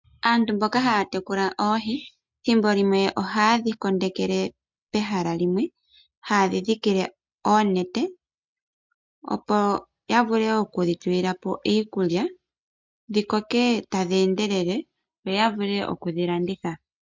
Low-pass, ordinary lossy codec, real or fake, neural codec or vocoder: 7.2 kHz; MP3, 64 kbps; real; none